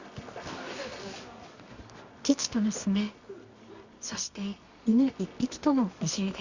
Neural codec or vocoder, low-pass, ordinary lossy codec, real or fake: codec, 24 kHz, 0.9 kbps, WavTokenizer, medium music audio release; 7.2 kHz; Opus, 64 kbps; fake